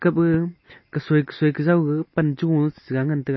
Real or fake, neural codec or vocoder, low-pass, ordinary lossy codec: real; none; 7.2 kHz; MP3, 24 kbps